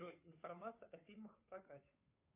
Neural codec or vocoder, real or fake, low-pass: codec, 16 kHz, 2 kbps, FunCodec, trained on Chinese and English, 25 frames a second; fake; 3.6 kHz